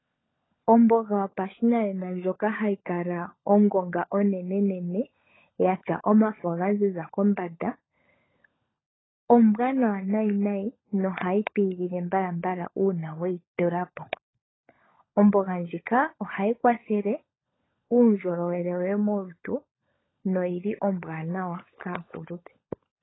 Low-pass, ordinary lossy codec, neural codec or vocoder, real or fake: 7.2 kHz; AAC, 16 kbps; codec, 16 kHz, 16 kbps, FunCodec, trained on LibriTTS, 50 frames a second; fake